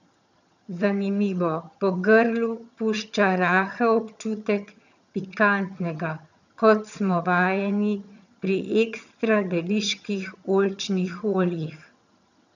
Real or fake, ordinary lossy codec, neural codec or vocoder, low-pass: fake; none; vocoder, 22.05 kHz, 80 mel bands, HiFi-GAN; 7.2 kHz